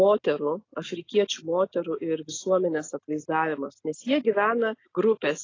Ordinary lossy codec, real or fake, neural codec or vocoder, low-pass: AAC, 32 kbps; real; none; 7.2 kHz